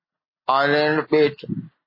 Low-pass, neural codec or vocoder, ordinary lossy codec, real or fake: 5.4 kHz; none; MP3, 24 kbps; real